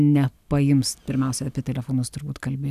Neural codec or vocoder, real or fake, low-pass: none; real; 14.4 kHz